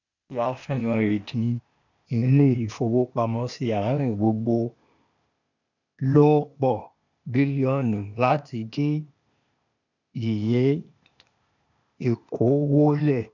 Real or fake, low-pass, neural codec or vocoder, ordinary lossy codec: fake; 7.2 kHz; codec, 16 kHz, 0.8 kbps, ZipCodec; none